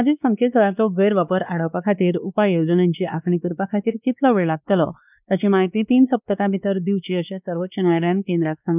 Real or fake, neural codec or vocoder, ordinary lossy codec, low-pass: fake; codec, 16 kHz, 2 kbps, X-Codec, WavLM features, trained on Multilingual LibriSpeech; none; 3.6 kHz